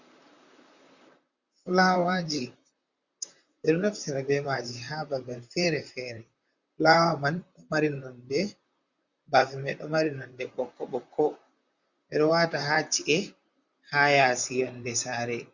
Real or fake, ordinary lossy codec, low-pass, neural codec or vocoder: fake; Opus, 64 kbps; 7.2 kHz; vocoder, 44.1 kHz, 128 mel bands, Pupu-Vocoder